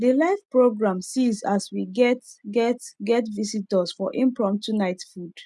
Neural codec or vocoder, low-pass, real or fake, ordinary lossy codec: none; none; real; none